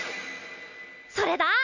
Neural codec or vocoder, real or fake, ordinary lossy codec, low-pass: none; real; none; 7.2 kHz